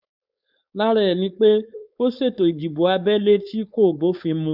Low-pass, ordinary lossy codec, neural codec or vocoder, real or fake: 5.4 kHz; none; codec, 16 kHz, 4.8 kbps, FACodec; fake